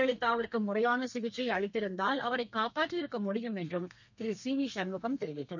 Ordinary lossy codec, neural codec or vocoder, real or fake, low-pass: none; codec, 32 kHz, 1.9 kbps, SNAC; fake; 7.2 kHz